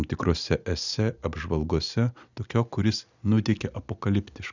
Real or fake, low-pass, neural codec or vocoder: real; 7.2 kHz; none